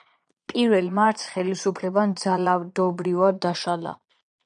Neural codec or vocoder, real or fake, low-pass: vocoder, 22.05 kHz, 80 mel bands, Vocos; fake; 9.9 kHz